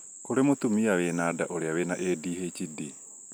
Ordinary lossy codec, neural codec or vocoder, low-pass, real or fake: none; none; none; real